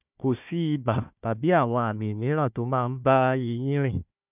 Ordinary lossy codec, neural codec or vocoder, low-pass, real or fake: none; codec, 16 kHz, 1 kbps, FunCodec, trained on Chinese and English, 50 frames a second; 3.6 kHz; fake